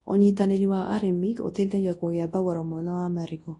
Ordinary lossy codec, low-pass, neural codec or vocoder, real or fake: AAC, 32 kbps; 10.8 kHz; codec, 24 kHz, 0.9 kbps, WavTokenizer, large speech release; fake